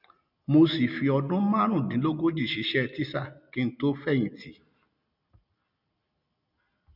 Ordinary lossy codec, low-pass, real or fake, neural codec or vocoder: none; 5.4 kHz; real; none